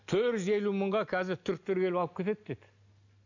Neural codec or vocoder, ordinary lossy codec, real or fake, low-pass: none; none; real; 7.2 kHz